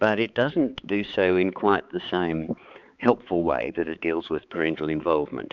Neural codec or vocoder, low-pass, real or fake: codec, 16 kHz, 4 kbps, X-Codec, HuBERT features, trained on balanced general audio; 7.2 kHz; fake